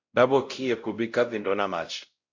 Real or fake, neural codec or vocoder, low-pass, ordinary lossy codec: fake; codec, 16 kHz, 0.5 kbps, X-Codec, WavLM features, trained on Multilingual LibriSpeech; 7.2 kHz; MP3, 48 kbps